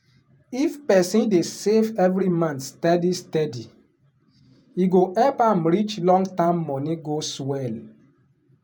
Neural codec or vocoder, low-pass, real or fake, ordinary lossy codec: vocoder, 48 kHz, 128 mel bands, Vocos; none; fake; none